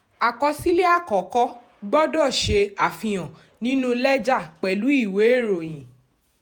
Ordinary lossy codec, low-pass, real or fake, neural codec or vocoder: none; none; fake; vocoder, 48 kHz, 128 mel bands, Vocos